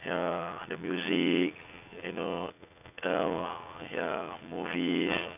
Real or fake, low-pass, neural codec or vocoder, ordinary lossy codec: fake; 3.6 kHz; vocoder, 44.1 kHz, 80 mel bands, Vocos; none